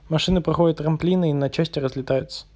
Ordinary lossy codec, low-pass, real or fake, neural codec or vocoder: none; none; real; none